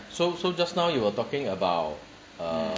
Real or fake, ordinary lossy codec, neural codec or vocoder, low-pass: real; none; none; none